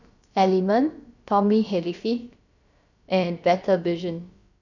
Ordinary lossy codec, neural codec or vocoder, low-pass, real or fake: none; codec, 16 kHz, about 1 kbps, DyCAST, with the encoder's durations; 7.2 kHz; fake